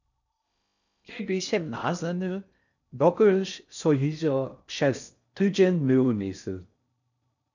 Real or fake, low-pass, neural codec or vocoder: fake; 7.2 kHz; codec, 16 kHz in and 24 kHz out, 0.6 kbps, FocalCodec, streaming, 4096 codes